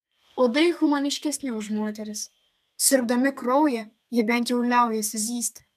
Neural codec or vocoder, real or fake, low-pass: codec, 32 kHz, 1.9 kbps, SNAC; fake; 14.4 kHz